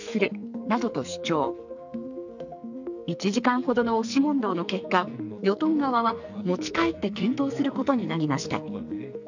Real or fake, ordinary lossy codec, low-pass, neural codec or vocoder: fake; none; 7.2 kHz; codec, 44.1 kHz, 2.6 kbps, SNAC